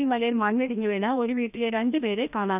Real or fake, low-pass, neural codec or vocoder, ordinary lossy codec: fake; 3.6 kHz; codec, 16 kHz, 1 kbps, FreqCodec, larger model; none